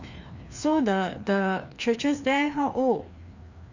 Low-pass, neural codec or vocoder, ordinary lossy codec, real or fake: 7.2 kHz; codec, 16 kHz, 2 kbps, FreqCodec, larger model; none; fake